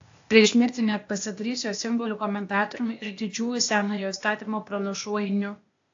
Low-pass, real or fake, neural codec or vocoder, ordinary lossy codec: 7.2 kHz; fake; codec, 16 kHz, 0.8 kbps, ZipCodec; AAC, 48 kbps